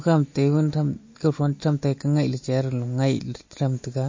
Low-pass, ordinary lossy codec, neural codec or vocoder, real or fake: 7.2 kHz; MP3, 32 kbps; none; real